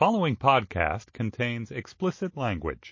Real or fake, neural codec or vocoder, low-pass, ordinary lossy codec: real; none; 7.2 kHz; MP3, 32 kbps